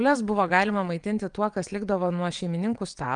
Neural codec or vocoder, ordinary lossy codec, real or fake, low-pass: vocoder, 22.05 kHz, 80 mel bands, WaveNeXt; Opus, 32 kbps; fake; 9.9 kHz